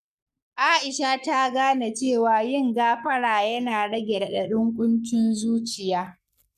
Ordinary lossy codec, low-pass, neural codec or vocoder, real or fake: none; 14.4 kHz; codec, 44.1 kHz, 7.8 kbps, Pupu-Codec; fake